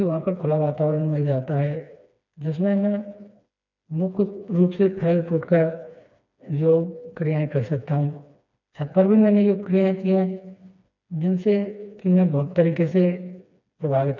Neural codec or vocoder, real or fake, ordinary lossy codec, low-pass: codec, 16 kHz, 2 kbps, FreqCodec, smaller model; fake; none; 7.2 kHz